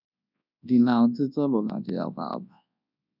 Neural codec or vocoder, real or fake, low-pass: codec, 24 kHz, 0.9 kbps, WavTokenizer, large speech release; fake; 5.4 kHz